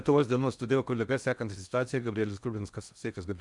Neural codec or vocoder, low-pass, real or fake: codec, 16 kHz in and 24 kHz out, 0.6 kbps, FocalCodec, streaming, 4096 codes; 10.8 kHz; fake